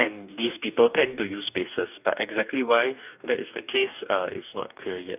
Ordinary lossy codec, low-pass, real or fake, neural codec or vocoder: none; 3.6 kHz; fake; codec, 44.1 kHz, 2.6 kbps, DAC